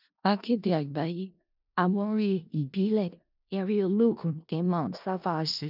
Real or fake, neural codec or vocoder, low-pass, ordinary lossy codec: fake; codec, 16 kHz in and 24 kHz out, 0.4 kbps, LongCat-Audio-Codec, four codebook decoder; 5.4 kHz; none